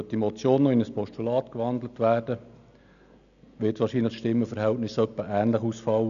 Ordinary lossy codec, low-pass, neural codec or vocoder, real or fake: none; 7.2 kHz; none; real